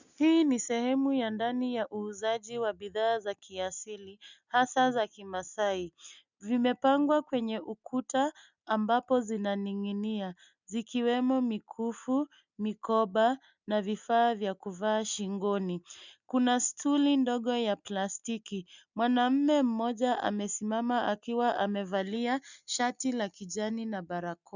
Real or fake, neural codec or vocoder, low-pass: real; none; 7.2 kHz